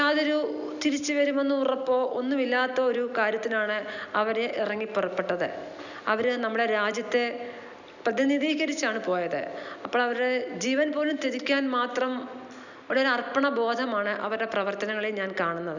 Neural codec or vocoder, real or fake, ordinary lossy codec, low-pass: none; real; none; 7.2 kHz